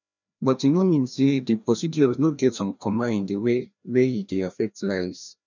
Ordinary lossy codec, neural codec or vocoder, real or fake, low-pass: none; codec, 16 kHz, 1 kbps, FreqCodec, larger model; fake; 7.2 kHz